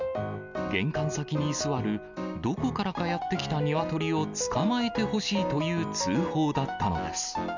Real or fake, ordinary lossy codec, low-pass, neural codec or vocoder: real; none; 7.2 kHz; none